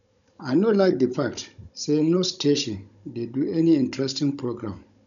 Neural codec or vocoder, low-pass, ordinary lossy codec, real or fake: codec, 16 kHz, 16 kbps, FunCodec, trained on Chinese and English, 50 frames a second; 7.2 kHz; none; fake